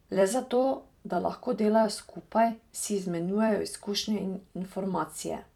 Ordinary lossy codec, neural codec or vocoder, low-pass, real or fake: none; vocoder, 44.1 kHz, 128 mel bands every 256 samples, BigVGAN v2; 19.8 kHz; fake